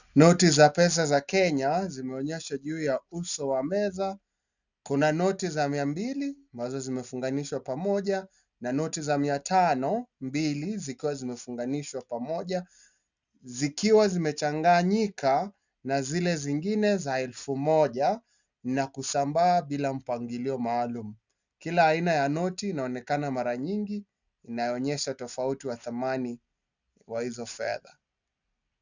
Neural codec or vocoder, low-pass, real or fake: none; 7.2 kHz; real